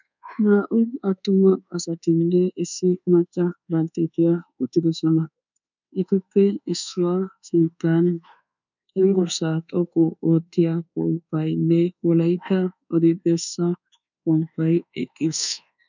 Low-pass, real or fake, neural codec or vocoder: 7.2 kHz; fake; codec, 24 kHz, 1.2 kbps, DualCodec